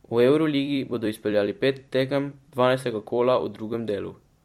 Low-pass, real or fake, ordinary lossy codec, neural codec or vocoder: 19.8 kHz; fake; MP3, 64 kbps; vocoder, 44.1 kHz, 128 mel bands every 256 samples, BigVGAN v2